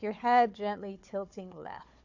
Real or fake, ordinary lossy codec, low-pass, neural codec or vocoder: fake; AAC, 48 kbps; 7.2 kHz; codec, 16 kHz, 4 kbps, FunCodec, trained on Chinese and English, 50 frames a second